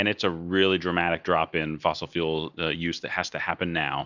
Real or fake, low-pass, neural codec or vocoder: real; 7.2 kHz; none